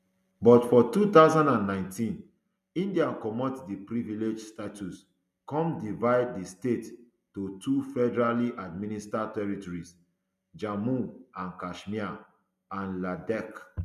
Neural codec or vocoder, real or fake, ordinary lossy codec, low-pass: none; real; none; 14.4 kHz